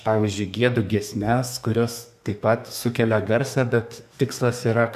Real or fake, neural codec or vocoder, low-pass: fake; codec, 32 kHz, 1.9 kbps, SNAC; 14.4 kHz